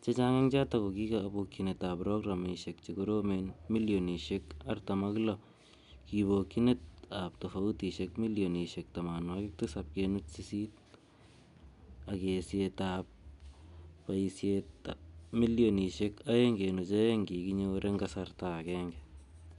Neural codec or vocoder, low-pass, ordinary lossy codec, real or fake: none; 10.8 kHz; none; real